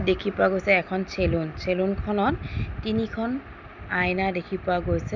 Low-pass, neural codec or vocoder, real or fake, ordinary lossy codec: 7.2 kHz; none; real; none